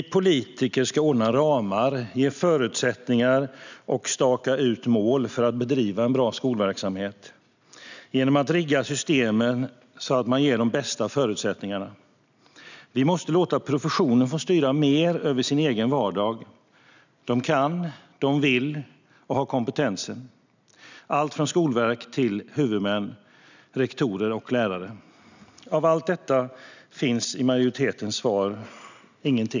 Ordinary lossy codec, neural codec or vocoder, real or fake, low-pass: none; none; real; 7.2 kHz